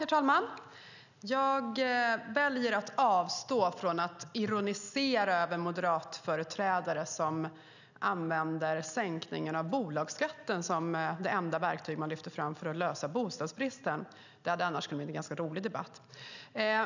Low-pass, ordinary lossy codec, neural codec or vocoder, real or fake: 7.2 kHz; none; none; real